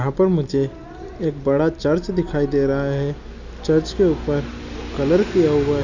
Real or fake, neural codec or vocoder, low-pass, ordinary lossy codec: real; none; 7.2 kHz; none